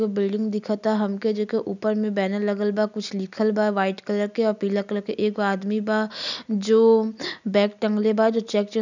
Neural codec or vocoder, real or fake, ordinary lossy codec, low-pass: none; real; none; 7.2 kHz